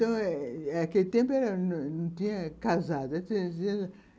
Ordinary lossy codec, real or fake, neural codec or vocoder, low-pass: none; real; none; none